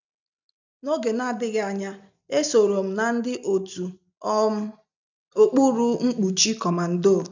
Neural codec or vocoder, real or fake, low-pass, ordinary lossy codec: none; real; 7.2 kHz; none